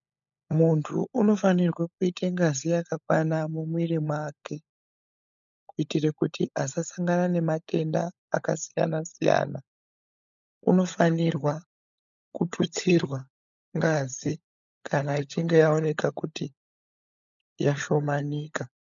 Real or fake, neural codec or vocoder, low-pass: fake; codec, 16 kHz, 16 kbps, FunCodec, trained on LibriTTS, 50 frames a second; 7.2 kHz